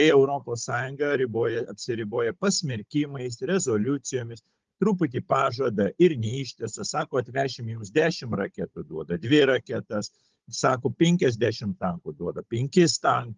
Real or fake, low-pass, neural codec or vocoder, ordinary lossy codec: fake; 7.2 kHz; codec, 16 kHz, 16 kbps, FunCodec, trained on Chinese and English, 50 frames a second; Opus, 32 kbps